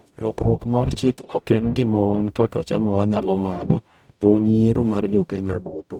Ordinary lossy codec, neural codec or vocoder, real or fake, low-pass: none; codec, 44.1 kHz, 0.9 kbps, DAC; fake; 19.8 kHz